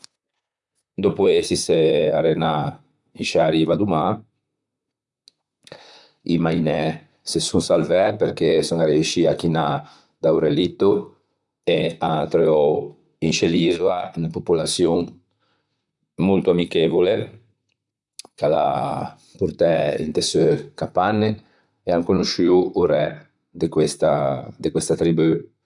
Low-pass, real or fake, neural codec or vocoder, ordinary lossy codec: 10.8 kHz; fake; vocoder, 44.1 kHz, 128 mel bands, Pupu-Vocoder; none